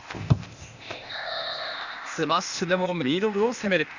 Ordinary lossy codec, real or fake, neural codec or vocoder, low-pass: Opus, 64 kbps; fake; codec, 16 kHz, 0.8 kbps, ZipCodec; 7.2 kHz